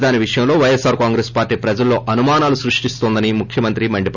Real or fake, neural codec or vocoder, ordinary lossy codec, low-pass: real; none; none; 7.2 kHz